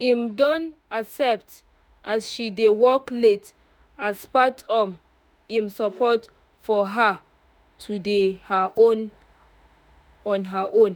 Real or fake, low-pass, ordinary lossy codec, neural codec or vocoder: fake; none; none; autoencoder, 48 kHz, 32 numbers a frame, DAC-VAE, trained on Japanese speech